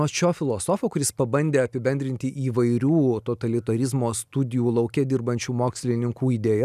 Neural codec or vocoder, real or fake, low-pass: none; real; 14.4 kHz